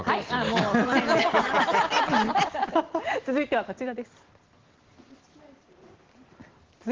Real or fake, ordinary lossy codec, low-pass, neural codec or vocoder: real; Opus, 16 kbps; 7.2 kHz; none